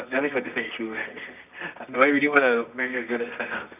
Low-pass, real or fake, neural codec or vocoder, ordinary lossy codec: 3.6 kHz; fake; codec, 24 kHz, 0.9 kbps, WavTokenizer, medium music audio release; none